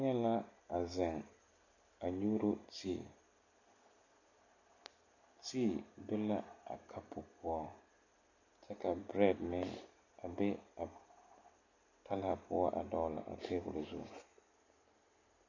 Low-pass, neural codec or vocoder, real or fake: 7.2 kHz; none; real